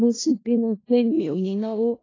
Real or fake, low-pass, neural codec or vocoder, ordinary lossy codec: fake; 7.2 kHz; codec, 16 kHz in and 24 kHz out, 0.4 kbps, LongCat-Audio-Codec, four codebook decoder; AAC, 32 kbps